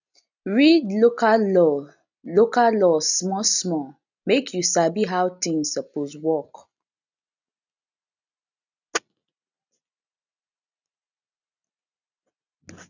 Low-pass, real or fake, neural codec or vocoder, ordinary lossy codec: 7.2 kHz; real; none; none